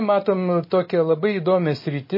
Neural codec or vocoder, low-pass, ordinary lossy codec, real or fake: none; 5.4 kHz; MP3, 24 kbps; real